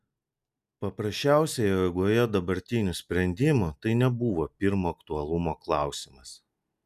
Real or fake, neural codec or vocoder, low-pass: real; none; 14.4 kHz